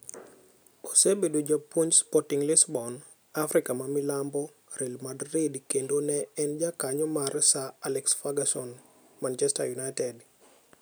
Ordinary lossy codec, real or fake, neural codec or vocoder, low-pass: none; real; none; none